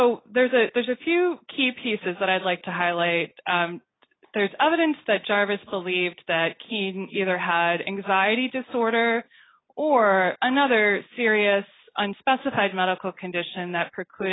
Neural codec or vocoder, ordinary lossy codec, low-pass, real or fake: none; AAC, 16 kbps; 7.2 kHz; real